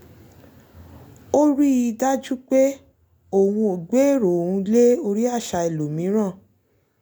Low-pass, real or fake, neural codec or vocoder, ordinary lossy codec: none; real; none; none